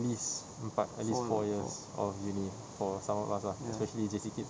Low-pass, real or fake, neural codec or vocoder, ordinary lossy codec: none; real; none; none